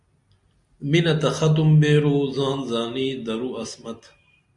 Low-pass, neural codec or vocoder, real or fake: 10.8 kHz; none; real